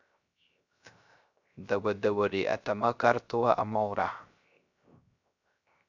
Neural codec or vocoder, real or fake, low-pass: codec, 16 kHz, 0.3 kbps, FocalCodec; fake; 7.2 kHz